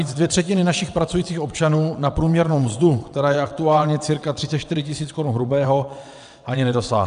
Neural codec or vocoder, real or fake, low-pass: vocoder, 22.05 kHz, 80 mel bands, WaveNeXt; fake; 9.9 kHz